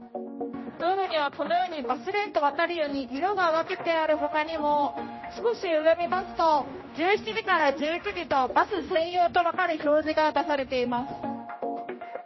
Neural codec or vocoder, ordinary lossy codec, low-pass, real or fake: codec, 16 kHz, 1 kbps, X-Codec, HuBERT features, trained on general audio; MP3, 24 kbps; 7.2 kHz; fake